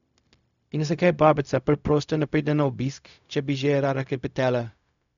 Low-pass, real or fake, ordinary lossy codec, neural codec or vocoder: 7.2 kHz; fake; none; codec, 16 kHz, 0.4 kbps, LongCat-Audio-Codec